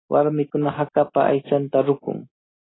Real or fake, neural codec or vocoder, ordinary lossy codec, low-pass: real; none; AAC, 16 kbps; 7.2 kHz